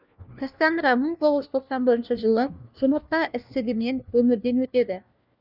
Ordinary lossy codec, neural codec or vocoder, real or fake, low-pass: none; codec, 16 kHz, 1 kbps, FunCodec, trained on LibriTTS, 50 frames a second; fake; 5.4 kHz